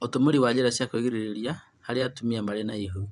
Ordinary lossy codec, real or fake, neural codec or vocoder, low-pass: none; fake; vocoder, 24 kHz, 100 mel bands, Vocos; 10.8 kHz